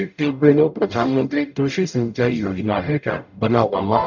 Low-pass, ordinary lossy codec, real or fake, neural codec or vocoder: 7.2 kHz; none; fake; codec, 44.1 kHz, 0.9 kbps, DAC